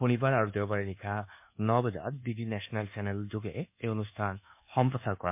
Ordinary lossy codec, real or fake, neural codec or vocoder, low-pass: MP3, 32 kbps; fake; codec, 24 kHz, 1.2 kbps, DualCodec; 3.6 kHz